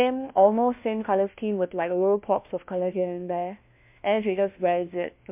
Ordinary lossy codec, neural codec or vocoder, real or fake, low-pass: MP3, 32 kbps; codec, 16 kHz, 1 kbps, FunCodec, trained on LibriTTS, 50 frames a second; fake; 3.6 kHz